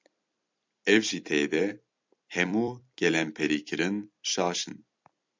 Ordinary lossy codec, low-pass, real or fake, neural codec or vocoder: MP3, 64 kbps; 7.2 kHz; fake; vocoder, 44.1 kHz, 128 mel bands every 256 samples, BigVGAN v2